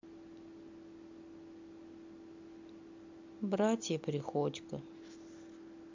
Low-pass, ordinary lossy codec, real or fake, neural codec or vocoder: 7.2 kHz; MP3, 48 kbps; real; none